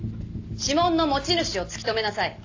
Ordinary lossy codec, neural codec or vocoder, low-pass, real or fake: AAC, 48 kbps; none; 7.2 kHz; real